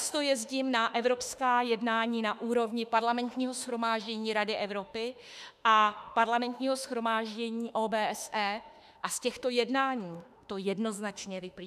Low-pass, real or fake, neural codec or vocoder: 14.4 kHz; fake; autoencoder, 48 kHz, 32 numbers a frame, DAC-VAE, trained on Japanese speech